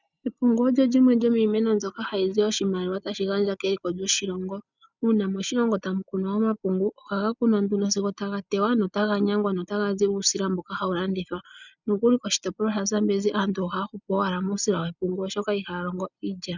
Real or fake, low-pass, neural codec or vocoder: real; 7.2 kHz; none